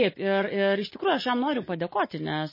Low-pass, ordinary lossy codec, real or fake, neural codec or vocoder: 5.4 kHz; MP3, 24 kbps; real; none